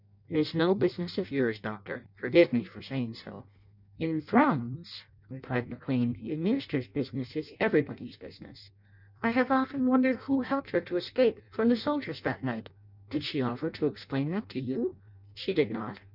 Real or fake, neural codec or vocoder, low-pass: fake; codec, 16 kHz in and 24 kHz out, 0.6 kbps, FireRedTTS-2 codec; 5.4 kHz